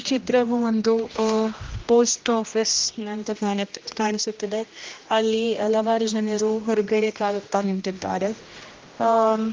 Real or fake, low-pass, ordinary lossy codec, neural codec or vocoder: fake; 7.2 kHz; Opus, 32 kbps; codec, 16 kHz, 1 kbps, X-Codec, HuBERT features, trained on general audio